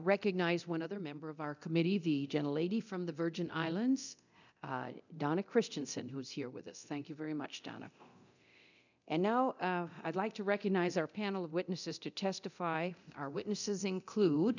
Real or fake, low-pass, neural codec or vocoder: fake; 7.2 kHz; codec, 24 kHz, 0.9 kbps, DualCodec